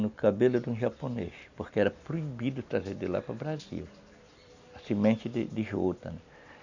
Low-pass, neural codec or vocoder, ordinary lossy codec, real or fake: 7.2 kHz; none; none; real